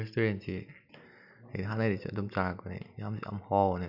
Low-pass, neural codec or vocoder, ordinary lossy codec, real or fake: 5.4 kHz; none; none; real